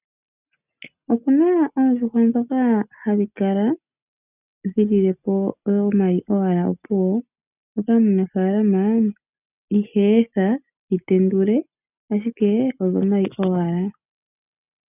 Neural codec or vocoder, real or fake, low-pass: none; real; 3.6 kHz